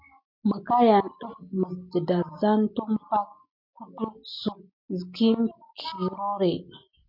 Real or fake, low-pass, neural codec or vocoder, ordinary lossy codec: real; 5.4 kHz; none; MP3, 48 kbps